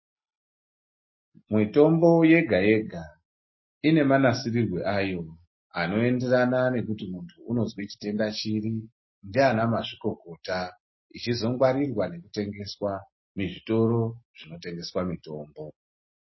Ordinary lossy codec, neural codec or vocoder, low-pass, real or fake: MP3, 24 kbps; none; 7.2 kHz; real